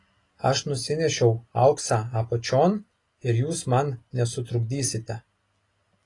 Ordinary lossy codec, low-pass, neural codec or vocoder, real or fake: AAC, 32 kbps; 10.8 kHz; none; real